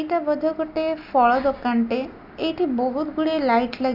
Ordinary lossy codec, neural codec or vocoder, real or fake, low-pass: AAC, 48 kbps; none; real; 5.4 kHz